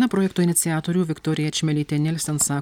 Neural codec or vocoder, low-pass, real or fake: none; 19.8 kHz; real